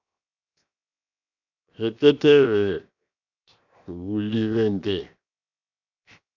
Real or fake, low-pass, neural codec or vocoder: fake; 7.2 kHz; codec, 16 kHz, 0.7 kbps, FocalCodec